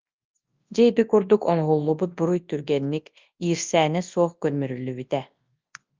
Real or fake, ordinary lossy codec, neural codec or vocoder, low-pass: fake; Opus, 24 kbps; codec, 24 kHz, 0.9 kbps, WavTokenizer, large speech release; 7.2 kHz